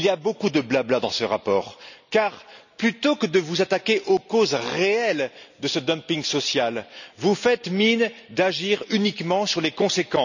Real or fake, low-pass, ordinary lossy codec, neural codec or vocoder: real; 7.2 kHz; none; none